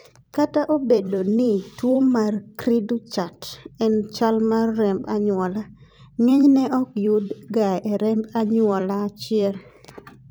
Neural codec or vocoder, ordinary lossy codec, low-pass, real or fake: vocoder, 44.1 kHz, 128 mel bands every 256 samples, BigVGAN v2; none; none; fake